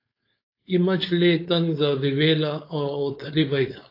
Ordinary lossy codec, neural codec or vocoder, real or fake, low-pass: AAC, 32 kbps; codec, 16 kHz, 4.8 kbps, FACodec; fake; 5.4 kHz